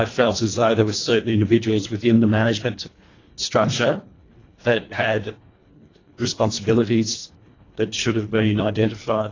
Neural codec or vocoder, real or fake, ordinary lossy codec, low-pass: codec, 24 kHz, 1.5 kbps, HILCodec; fake; AAC, 32 kbps; 7.2 kHz